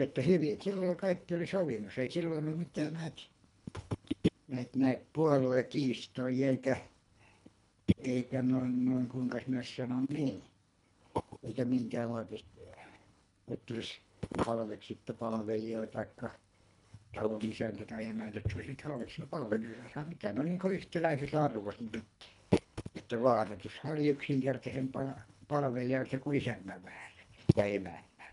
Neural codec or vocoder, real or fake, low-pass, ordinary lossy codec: codec, 24 kHz, 1.5 kbps, HILCodec; fake; 10.8 kHz; none